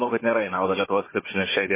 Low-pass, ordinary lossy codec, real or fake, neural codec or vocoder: 3.6 kHz; MP3, 16 kbps; fake; codec, 16 kHz, 4 kbps, FunCodec, trained on Chinese and English, 50 frames a second